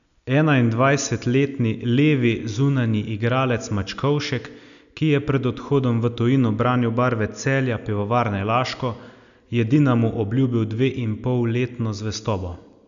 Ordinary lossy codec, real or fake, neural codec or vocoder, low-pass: none; real; none; 7.2 kHz